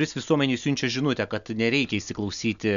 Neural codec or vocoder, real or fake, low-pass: none; real; 7.2 kHz